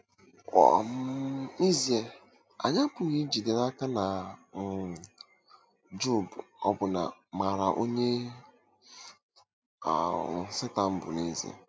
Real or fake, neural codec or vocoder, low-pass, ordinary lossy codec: real; none; none; none